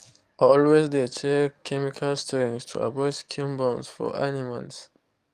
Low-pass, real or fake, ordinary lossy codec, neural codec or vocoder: 14.4 kHz; fake; Opus, 32 kbps; codec, 44.1 kHz, 7.8 kbps, DAC